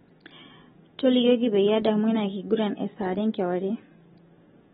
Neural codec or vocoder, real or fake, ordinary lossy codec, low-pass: none; real; AAC, 16 kbps; 19.8 kHz